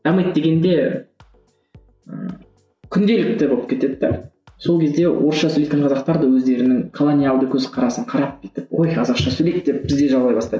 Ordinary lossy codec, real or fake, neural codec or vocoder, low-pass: none; real; none; none